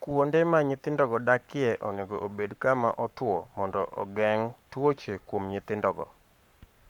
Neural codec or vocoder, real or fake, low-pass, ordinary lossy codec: codec, 44.1 kHz, 7.8 kbps, Pupu-Codec; fake; 19.8 kHz; none